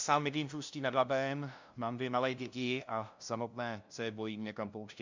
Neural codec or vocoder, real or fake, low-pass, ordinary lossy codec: codec, 16 kHz, 0.5 kbps, FunCodec, trained on LibriTTS, 25 frames a second; fake; 7.2 kHz; MP3, 64 kbps